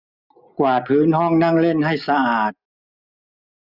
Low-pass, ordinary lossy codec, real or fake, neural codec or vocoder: 5.4 kHz; none; real; none